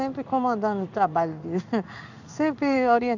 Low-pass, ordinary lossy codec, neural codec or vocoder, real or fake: 7.2 kHz; none; codec, 16 kHz in and 24 kHz out, 1 kbps, XY-Tokenizer; fake